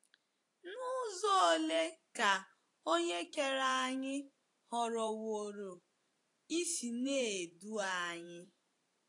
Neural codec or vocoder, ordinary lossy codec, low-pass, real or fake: vocoder, 44.1 kHz, 128 mel bands every 512 samples, BigVGAN v2; AAC, 48 kbps; 10.8 kHz; fake